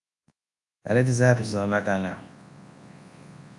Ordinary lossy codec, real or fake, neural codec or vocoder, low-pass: AAC, 64 kbps; fake; codec, 24 kHz, 0.9 kbps, WavTokenizer, large speech release; 10.8 kHz